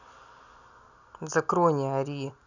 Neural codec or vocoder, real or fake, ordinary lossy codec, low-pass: none; real; none; 7.2 kHz